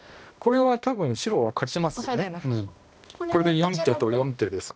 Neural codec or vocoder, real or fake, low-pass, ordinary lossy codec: codec, 16 kHz, 1 kbps, X-Codec, HuBERT features, trained on balanced general audio; fake; none; none